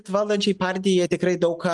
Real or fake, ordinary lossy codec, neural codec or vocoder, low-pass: real; Opus, 32 kbps; none; 10.8 kHz